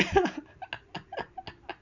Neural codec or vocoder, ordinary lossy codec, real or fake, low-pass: none; none; real; 7.2 kHz